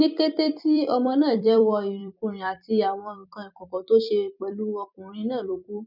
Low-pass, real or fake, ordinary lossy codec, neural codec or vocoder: 5.4 kHz; fake; none; vocoder, 44.1 kHz, 128 mel bands every 256 samples, BigVGAN v2